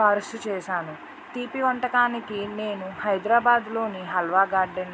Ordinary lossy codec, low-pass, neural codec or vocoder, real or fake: none; none; none; real